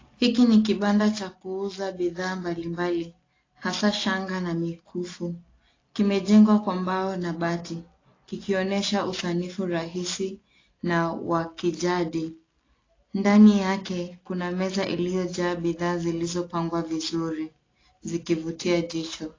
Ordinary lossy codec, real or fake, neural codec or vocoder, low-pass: AAC, 32 kbps; real; none; 7.2 kHz